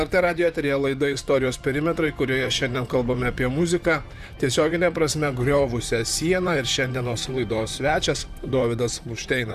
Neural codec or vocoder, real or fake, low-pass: vocoder, 44.1 kHz, 128 mel bands, Pupu-Vocoder; fake; 14.4 kHz